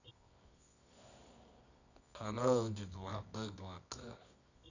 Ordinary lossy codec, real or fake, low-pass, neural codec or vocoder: none; fake; 7.2 kHz; codec, 24 kHz, 0.9 kbps, WavTokenizer, medium music audio release